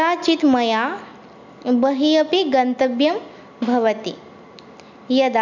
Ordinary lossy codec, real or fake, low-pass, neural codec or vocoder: none; real; 7.2 kHz; none